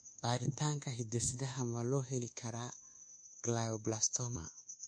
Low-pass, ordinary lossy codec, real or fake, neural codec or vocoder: 9.9 kHz; MP3, 48 kbps; fake; codec, 24 kHz, 1.2 kbps, DualCodec